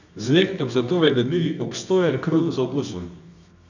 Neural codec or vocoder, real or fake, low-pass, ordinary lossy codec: codec, 24 kHz, 0.9 kbps, WavTokenizer, medium music audio release; fake; 7.2 kHz; none